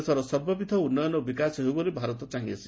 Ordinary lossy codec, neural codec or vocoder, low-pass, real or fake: none; none; none; real